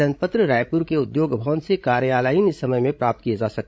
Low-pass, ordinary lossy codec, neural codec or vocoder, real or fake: none; none; codec, 16 kHz, 16 kbps, FreqCodec, larger model; fake